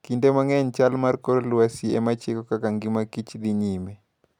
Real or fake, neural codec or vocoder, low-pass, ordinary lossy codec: real; none; 19.8 kHz; none